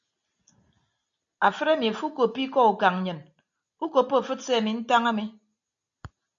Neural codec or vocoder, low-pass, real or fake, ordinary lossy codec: none; 7.2 kHz; real; MP3, 96 kbps